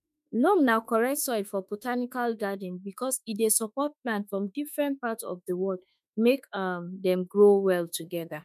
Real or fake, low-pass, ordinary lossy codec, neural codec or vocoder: fake; 14.4 kHz; none; autoencoder, 48 kHz, 32 numbers a frame, DAC-VAE, trained on Japanese speech